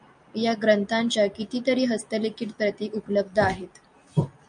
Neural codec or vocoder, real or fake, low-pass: none; real; 9.9 kHz